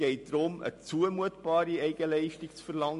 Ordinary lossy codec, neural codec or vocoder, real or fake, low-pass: MP3, 48 kbps; none; real; 14.4 kHz